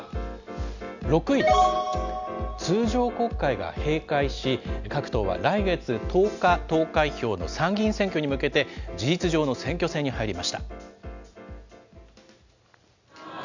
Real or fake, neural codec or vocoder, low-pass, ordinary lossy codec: real; none; 7.2 kHz; none